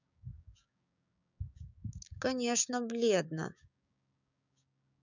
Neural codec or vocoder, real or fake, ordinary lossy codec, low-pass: codec, 16 kHz, 6 kbps, DAC; fake; none; 7.2 kHz